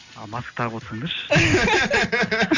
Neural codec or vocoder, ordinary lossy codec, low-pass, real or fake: none; none; 7.2 kHz; real